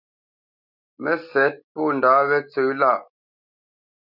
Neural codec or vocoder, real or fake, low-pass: none; real; 5.4 kHz